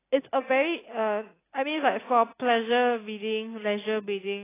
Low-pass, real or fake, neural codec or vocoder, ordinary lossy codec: 3.6 kHz; real; none; AAC, 16 kbps